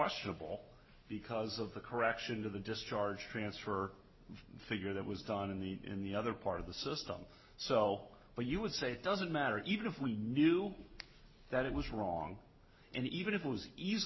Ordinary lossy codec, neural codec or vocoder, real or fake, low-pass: MP3, 24 kbps; none; real; 7.2 kHz